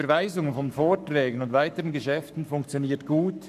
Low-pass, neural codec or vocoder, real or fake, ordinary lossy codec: 14.4 kHz; codec, 44.1 kHz, 7.8 kbps, Pupu-Codec; fake; none